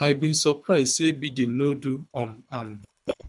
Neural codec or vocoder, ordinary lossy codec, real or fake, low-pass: codec, 24 kHz, 3 kbps, HILCodec; MP3, 96 kbps; fake; 10.8 kHz